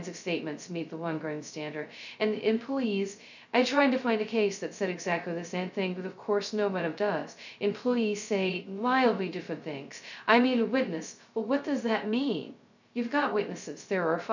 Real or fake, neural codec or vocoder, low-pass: fake; codec, 16 kHz, 0.2 kbps, FocalCodec; 7.2 kHz